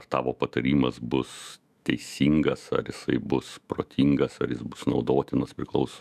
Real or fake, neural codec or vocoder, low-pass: fake; autoencoder, 48 kHz, 128 numbers a frame, DAC-VAE, trained on Japanese speech; 14.4 kHz